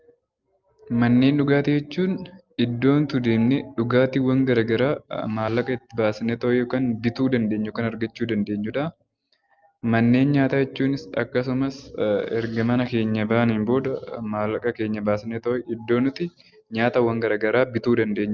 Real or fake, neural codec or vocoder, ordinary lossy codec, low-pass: real; none; Opus, 24 kbps; 7.2 kHz